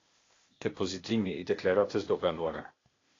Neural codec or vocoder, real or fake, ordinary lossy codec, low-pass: codec, 16 kHz, 0.8 kbps, ZipCodec; fake; AAC, 32 kbps; 7.2 kHz